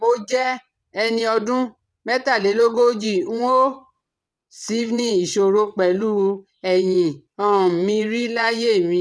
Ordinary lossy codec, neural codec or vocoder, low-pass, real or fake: none; vocoder, 22.05 kHz, 80 mel bands, WaveNeXt; none; fake